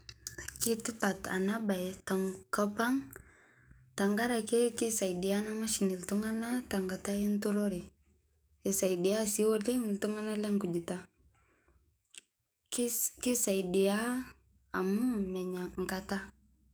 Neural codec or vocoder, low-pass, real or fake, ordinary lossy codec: codec, 44.1 kHz, 7.8 kbps, DAC; none; fake; none